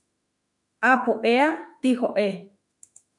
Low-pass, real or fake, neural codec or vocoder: 10.8 kHz; fake; autoencoder, 48 kHz, 32 numbers a frame, DAC-VAE, trained on Japanese speech